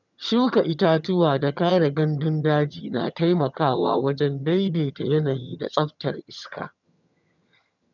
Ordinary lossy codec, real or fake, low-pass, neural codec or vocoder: none; fake; 7.2 kHz; vocoder, 22.05 kHz, 80 mel bands, HiFi-GAN